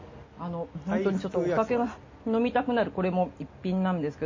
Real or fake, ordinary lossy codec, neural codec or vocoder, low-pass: real; MP3, 32 kbps; none; 7.2 kHz